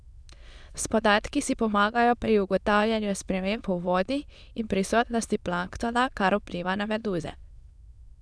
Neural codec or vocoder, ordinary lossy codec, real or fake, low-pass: autoencoder, 22.05 kHz, a latent of 192 numbers a frame, VITS, trained on many speakers; none; fake; none